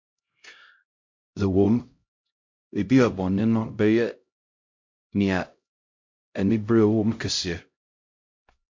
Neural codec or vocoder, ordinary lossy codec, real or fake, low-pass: codec, 16 kHz, 0.5 kbps, X-Codec, HuBERT features, trained on LibriSpeech; MP3, 48 kbps; fake; 7.2 kHz